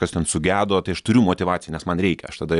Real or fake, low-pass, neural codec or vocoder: real; 10.8 kHz; none